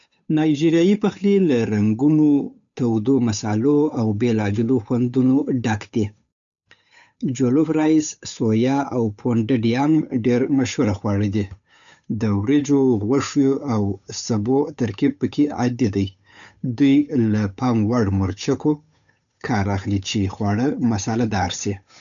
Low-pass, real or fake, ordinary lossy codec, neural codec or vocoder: 7.2 kHz; fake; none; codec, 16 kHz, 8 kbps, FunCodec, trained on Chinese and English, 25 frames a second